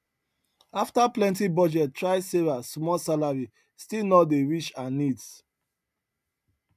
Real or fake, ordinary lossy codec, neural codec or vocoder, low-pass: real; MP3, 96 kbps; none; 14.4 kHz